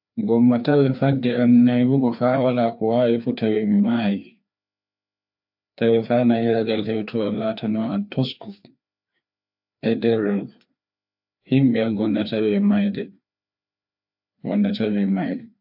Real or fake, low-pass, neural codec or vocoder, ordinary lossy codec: fake; 5.4 kHz; codec, 16 kHz, 2 kbps, FreqCodec, larger model; AAC, 48 kbps